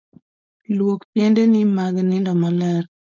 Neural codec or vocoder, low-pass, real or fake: codec, 16 kHz, 4.8 kbps, FACodec; 7.2 kHz; fake